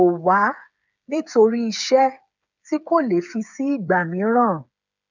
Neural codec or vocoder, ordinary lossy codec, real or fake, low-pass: codec, 16 kHz, 8 kbps, FreqCodec, smaller model; none; fake; 7.2 kHz